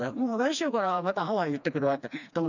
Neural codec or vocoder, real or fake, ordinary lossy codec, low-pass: codec, 16 kHz, 2 kbps, FreqCodec, smaller model; fake; none; 7.2 kHz